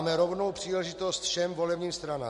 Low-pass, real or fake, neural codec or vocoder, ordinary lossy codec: 14.4 kHz; real; none; MP3, 48 kbps